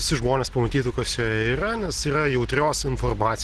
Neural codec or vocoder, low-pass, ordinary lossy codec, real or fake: none; 10.8 kHz; Opus, 16 kbps; real